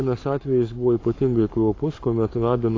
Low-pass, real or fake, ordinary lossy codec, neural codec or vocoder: 7.2 kHz; fake; AAC, 32 kbps; codec, 44.1 kHz, 7.8 kbps, Pupu-Codec